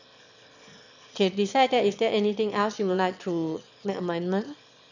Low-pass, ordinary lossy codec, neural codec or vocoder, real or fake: 7.2 kHz; none; autoencoder, 22.05 kHz, a latent of 192 numbers a frame, VITS, trained on one speaker; fake